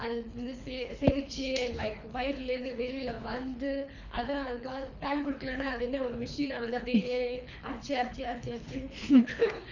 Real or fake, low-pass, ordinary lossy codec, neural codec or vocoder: fake; 7.2 kHz; none; codec, 24 kHz, 3 kbps, HILCodec